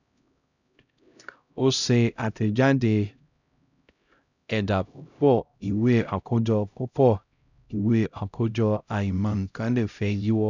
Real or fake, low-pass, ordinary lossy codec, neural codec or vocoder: fake; 7.2 kHz; none; codec, 16 kHz, 0.5 kbps, X-Codec, HuBERT features, trained on LibriSpeech